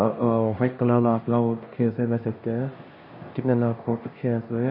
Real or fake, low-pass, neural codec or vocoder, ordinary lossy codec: fake; 5.4 kHz; codec, 16 kHz, 1 kbps, X-Codec, HuBERT features, trained on LibriSpeech; MP3, 24 kbps